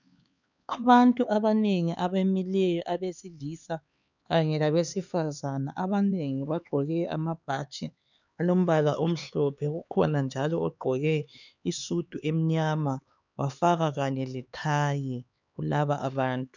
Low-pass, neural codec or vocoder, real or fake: 7.2 kHz; codec, 16 kHz, 4 kbps, X-Codec, HuBERT features, trained on LibriSpeech; fake